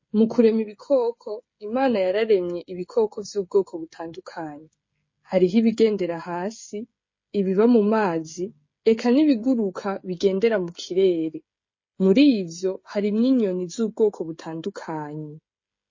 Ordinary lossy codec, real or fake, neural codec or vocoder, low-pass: MP3, 32 kbps; fake; codec, 16 kHz, 16 kbps, FreqCodec, smaller model; 7.2 kHz